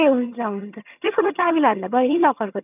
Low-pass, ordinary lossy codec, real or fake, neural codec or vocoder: 3.6 kHz; none; fake; vocoder, 22.05 kHz, 80 mel bands, HiFi-GAN